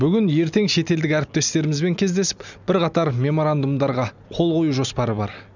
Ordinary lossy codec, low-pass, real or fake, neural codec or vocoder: none; 7.2 kHz; real; none